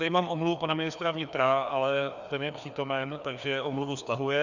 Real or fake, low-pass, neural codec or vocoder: fake; 7.2 kHz; codec, 16 kHz, 2 kbps, FreqCodec, larger model